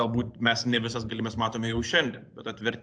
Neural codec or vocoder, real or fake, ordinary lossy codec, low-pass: vocoder, 24 kHz, 100 mel bands, Vocos; fake; AAC, 64 kbps; 9.9 kHz